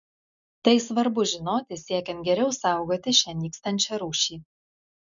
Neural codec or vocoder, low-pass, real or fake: none; 7.2 kHz; real